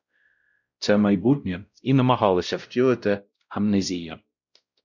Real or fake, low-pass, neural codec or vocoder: fake; 7.2 kHz; codec, 16 kHz, 0.5 kbps, X-Codec, WavLM features, trained on Multilingual LibriSpeech